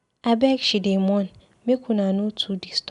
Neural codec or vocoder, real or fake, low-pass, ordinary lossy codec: none; real; 10.8 kHz; none